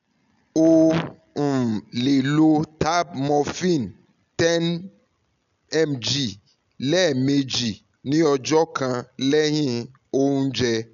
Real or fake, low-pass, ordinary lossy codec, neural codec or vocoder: real; 7.2 kHz; none; none